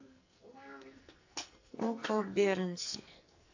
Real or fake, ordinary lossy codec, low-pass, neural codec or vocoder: fake; AAC, 48 kbps; 7.2 kHz; codec, 44.1 kHz, 2.6 kbps, SNAC